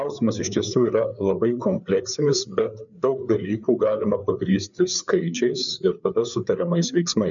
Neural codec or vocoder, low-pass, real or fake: codec, 16 kHz, 4 kbps, FreqCodec, larger model; 7.2 kHz; fake